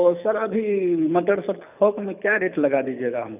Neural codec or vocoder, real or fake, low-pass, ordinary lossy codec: codec, 24 kHz, 6 kbps, HILCodec; fake; 3.6 kHz; none